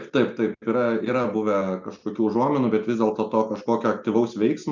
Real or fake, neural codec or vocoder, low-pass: real; none; 7.2 kHz